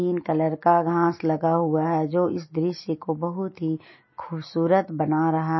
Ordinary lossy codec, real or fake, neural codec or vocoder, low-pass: MP3, 24 kbps; real; none; 7.2 kHz